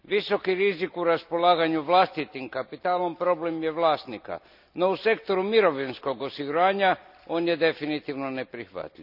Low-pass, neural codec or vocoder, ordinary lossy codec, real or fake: 5.4 kHz; none; none; real